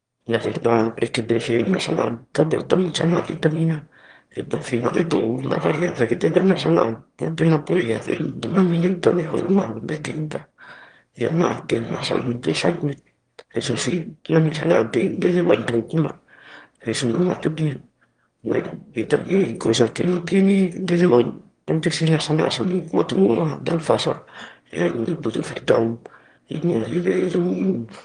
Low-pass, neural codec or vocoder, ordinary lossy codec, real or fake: 9.9 kHz; autoencoder, 22.05 kHz, a latent of 192 numbers a frame, VITS, trained on one speaker; Opus, 24 kbps; fake